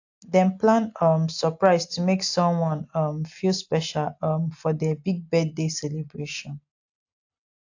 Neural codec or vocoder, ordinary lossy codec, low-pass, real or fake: none; AAC, 48 kbps; 7.2 kHz; real